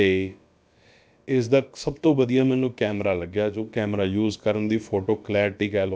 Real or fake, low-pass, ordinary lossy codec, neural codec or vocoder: fake; none; none; codec, 16 kHz, about 1 kbps, DyCAST, with the encoder's durations